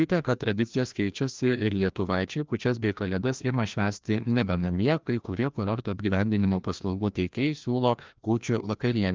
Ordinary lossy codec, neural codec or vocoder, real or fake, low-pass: Opus, 24 kbps; codec, 16 kHz, 1 kbps, FreqCodec, larger model; fake; 7.2 kHz